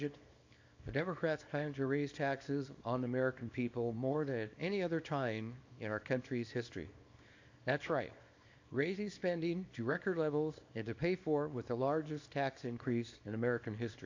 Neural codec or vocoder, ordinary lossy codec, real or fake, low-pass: codec, 24 kHz, 0.9 kbps, WavTokenizer, small release; AAC, 48 kbps; fake; 7.2 kHz